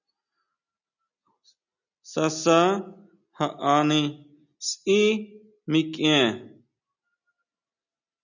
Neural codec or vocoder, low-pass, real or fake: none; 7.2 kHz; real